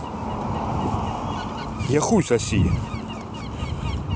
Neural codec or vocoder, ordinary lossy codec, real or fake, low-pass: none; none; real; none